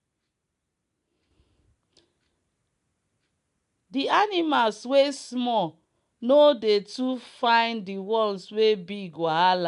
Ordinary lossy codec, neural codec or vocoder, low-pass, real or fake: none; none; 10.8 kHz; real